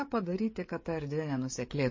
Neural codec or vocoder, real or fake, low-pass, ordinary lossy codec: codec, 16 kHz, 16 kbps, FreqCodec, smaller model; fake; 7.2 kHz; MP3, 32 kbps